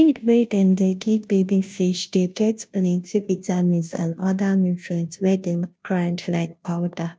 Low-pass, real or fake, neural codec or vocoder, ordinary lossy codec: none; fake; codec, 16 kHz, 0.5 kbps, FunCodec, trained on Chinese and English, 25 frames a second; none